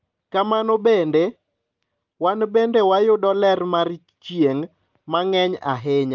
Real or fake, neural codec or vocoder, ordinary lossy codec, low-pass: real; none; Opus, 24 kbps; 7.2 kHz